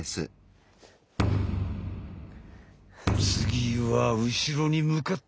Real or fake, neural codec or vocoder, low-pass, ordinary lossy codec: real; none; none; none